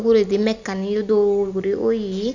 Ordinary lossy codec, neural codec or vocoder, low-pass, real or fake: none; vocoder, 44.1 kHz, 128 mel bands every 256 samples, BigVGAN v2; 7.2 kHz; fake